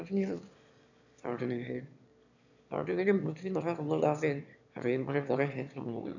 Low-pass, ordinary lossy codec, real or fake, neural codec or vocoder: 7.2 kHz; none; fake; autoencoder, 22.05 kHz, a latent of 192 numbers a frame, VITS, trained on one speaker